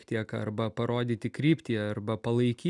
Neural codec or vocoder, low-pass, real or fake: none; 10.8 kHz; real